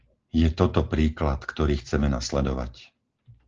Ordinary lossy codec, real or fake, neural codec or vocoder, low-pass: Opus, 16 kbps; real; none; 7.2 kHz